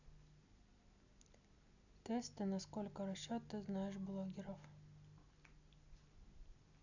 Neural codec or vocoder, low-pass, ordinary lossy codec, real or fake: none; 7.2 kHz; none; real